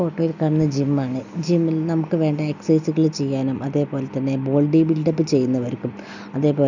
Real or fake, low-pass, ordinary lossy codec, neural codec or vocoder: real; 7.2 kHz; none; none